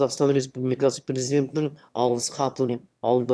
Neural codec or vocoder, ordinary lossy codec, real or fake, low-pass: autoencoder, 22.05 kHz, a latent of 192 numbers a frame, VITS, trained on one speaker; none; fake; none